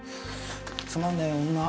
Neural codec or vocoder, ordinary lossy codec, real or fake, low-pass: none; none; real; none